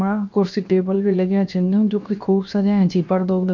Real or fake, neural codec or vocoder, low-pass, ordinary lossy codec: fake; codec, 16 kHz, 0.7 kbps, FocalCodec; 7.2 kHz; none